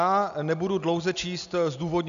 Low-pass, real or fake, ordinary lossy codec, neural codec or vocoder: 7.2 kHz; real; MP3, 96 kbps; none